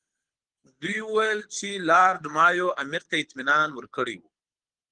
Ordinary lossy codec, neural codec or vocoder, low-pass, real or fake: Opus, 24 kbps; codec, 24 kHz, 6 kbps, HILCodec; 9.9 kHz; fake